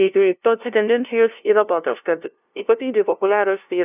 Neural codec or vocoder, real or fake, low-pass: codec, 16 kHz, 0.5 kbps, FunCodec, trained on LibriTTS, 25 frames a second; fake; 3.6 kHz